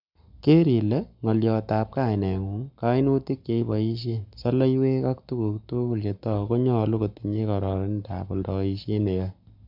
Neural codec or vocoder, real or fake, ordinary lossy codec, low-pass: codec, 44.1 kHz, 7.8 kbps, Pupu-Codec; fake; none; 5.4 kHz